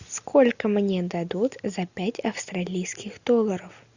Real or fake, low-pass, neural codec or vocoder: real; 7.2 kHz; none